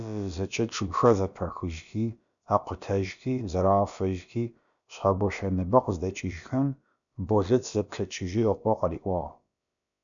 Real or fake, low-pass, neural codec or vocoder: fake; 7.2 kHz; codec, 16 kHz, about 1 kbps, DyCAST, with the encoder's durations